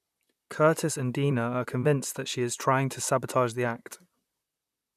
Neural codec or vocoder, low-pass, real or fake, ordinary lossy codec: vocoder, 44.1 kHz, 128 mel bands, Pupu-Vocoder; 14.4 kHz; fake; none